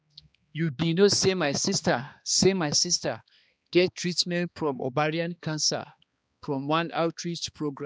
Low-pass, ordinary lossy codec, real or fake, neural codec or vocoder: none; none; fake; codec, 16 kHz, 2 kbps, X-Codec, HuBERT features, trained on balanced general audio